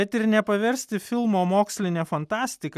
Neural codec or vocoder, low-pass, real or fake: none; 14.4 kHz; real